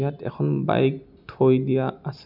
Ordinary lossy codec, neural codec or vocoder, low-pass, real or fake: none; none; 5.4 kHz; real